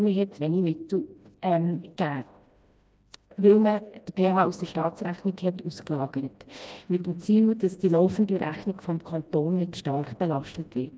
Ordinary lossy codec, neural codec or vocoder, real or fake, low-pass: none; codec, 16 kHz, 1 kbps, FreqCodec, smaller model; fake; none